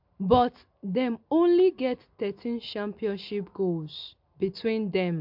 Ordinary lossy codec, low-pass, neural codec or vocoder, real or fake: MP3, 48 kbps; 5.4 kHz; none; real